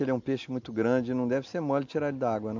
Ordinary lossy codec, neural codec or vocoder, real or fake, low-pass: MP3, 64 kbps; none; real; 7.2 kHz